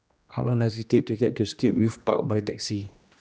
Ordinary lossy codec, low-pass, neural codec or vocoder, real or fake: none; none; codec, 16 kHz, 1 kbps, X-Codec, HuBERT features, trained on general audio; fake